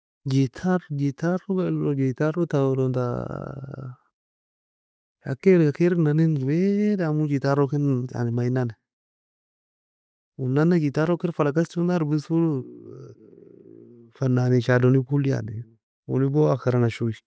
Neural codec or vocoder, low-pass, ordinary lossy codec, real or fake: none; none; none; real